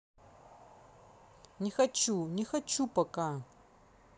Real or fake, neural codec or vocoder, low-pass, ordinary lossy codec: real; none; none; none